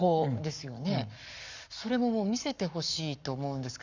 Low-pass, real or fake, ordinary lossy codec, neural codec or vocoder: 7.2 kHz; fake; none; codec, 44.1 kHz, 7.8 kbps, Pupu-Codec